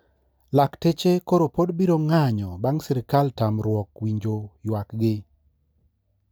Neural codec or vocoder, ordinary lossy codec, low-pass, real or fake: none; none; none; real